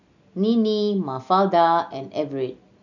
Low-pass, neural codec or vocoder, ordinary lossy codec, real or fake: 7.2 kHz; none; none; real